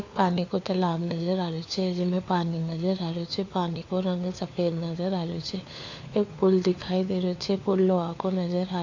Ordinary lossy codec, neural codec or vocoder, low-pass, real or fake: AAC, 48 kbps; codec, 16 kHz, 4 kbps, FunCodec, trained on LibriTTS, 50 frames a second; 7.2 kHz; fake